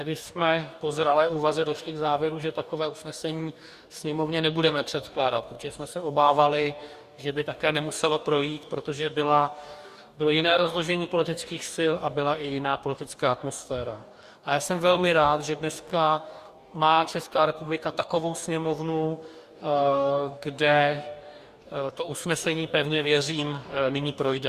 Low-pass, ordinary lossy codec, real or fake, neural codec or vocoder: 14.4 kHz; Opus, 64 kbps; fake; codec, 44.1 kHz, 2.6 kbps, DAC